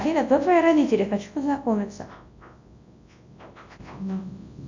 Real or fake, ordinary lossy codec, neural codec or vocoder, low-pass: fake; MP3, 64 kbps; codec, 24 kHz, 0.9 kbps, WavTokenizer, large speech release; 7.2 kHz